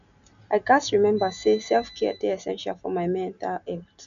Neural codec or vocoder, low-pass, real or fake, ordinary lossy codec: none; 7.2 kHz; real; none